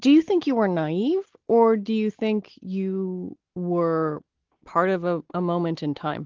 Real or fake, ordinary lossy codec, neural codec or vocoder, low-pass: real; Opus, 16 kbps; none; 7.2 kHz